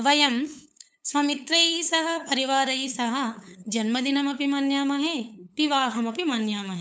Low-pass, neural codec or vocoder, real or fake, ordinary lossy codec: none; codec, 16 kHz, 8 kbps, FunCodec, trained on LibriTTS, 25 frames a second; fake; none